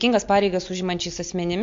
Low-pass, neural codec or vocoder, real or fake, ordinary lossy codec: 7.2 kHz; none; real; MP3, 48 kbps